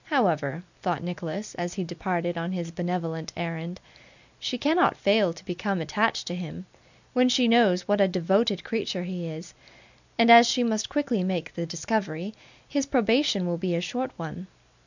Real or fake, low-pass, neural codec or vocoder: real; 7.2 kHz; none